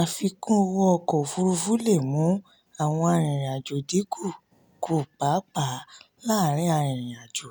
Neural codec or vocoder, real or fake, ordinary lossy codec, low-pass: none; real; none; none